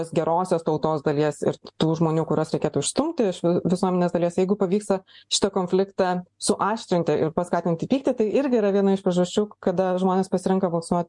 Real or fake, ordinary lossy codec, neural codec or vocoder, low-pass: real; MP3, 64 kbps; none; 10.8 kHz